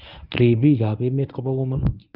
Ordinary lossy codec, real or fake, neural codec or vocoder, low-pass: none; fake; codec, 24 kHz, 0.9 kbps, WavTokenizer, medium speech release version 1; 5.4 kHz